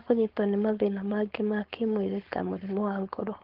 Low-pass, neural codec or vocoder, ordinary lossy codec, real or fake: 5.4 kHz; codec, 16 kHz, 4.8 kbps, FACodec; Opus, 16 kbps; fake